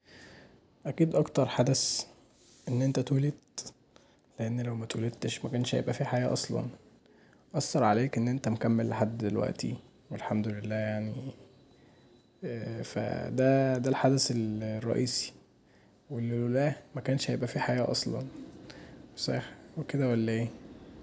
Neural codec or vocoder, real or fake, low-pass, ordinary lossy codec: none; real; none; none